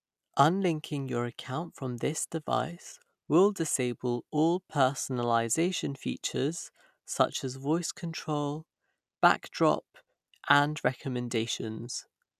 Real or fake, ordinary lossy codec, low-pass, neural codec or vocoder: real; none; 14.4 kHz; none